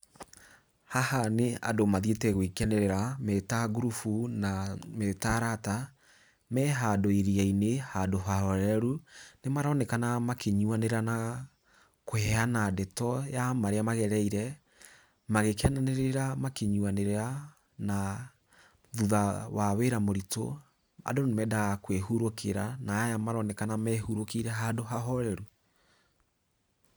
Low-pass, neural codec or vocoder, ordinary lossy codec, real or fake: none; none; none; real